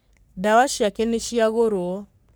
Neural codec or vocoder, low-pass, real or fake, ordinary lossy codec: codec, 44.1 kHz, 3.4 kbps, Pupu-Codec; none; fake; none